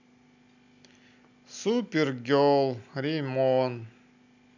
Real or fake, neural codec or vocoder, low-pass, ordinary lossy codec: real; none; 7.2 kHz; none